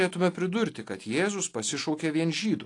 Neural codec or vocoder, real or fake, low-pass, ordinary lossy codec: none; real; 10.8 kHz; AAC, 48 kbps